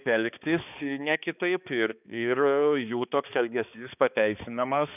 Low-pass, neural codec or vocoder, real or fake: 3.6 kHz; codec, 16 kHz, 2 kbps, X-Codec, HuBERT features, trained on balanced general audio; fake